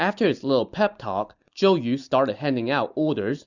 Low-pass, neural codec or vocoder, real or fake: 7.2 kHz; none; real